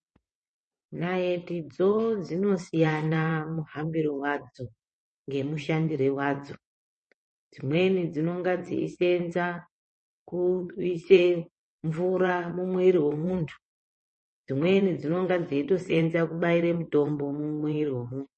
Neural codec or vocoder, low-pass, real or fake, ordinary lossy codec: vocoder, 22.05 kHz, 80 mel bands, WaveNeXt; 9.9 kHz; fake; MP3, 32 kbps